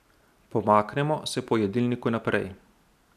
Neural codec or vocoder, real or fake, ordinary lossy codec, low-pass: none; real; none; 14.4 kHz